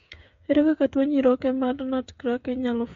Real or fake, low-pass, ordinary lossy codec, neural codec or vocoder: fake; 7.2 kHz; MP3, 48 kbps; codec, 16 kHz, 8 kbps, FreqCodec, smaller model